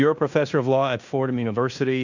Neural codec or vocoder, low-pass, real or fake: codec, 16 kHz in and 24 kHz out, 0.9 kbps, LongCat-Audio-Codec, fine tuned four codebook decoder; 7.2 kHz; fake